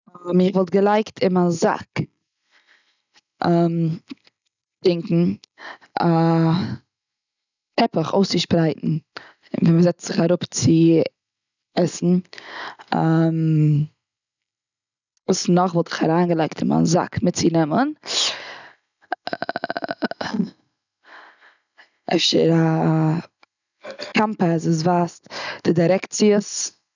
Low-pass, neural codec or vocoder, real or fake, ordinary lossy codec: 7.2 kHz; none; real; none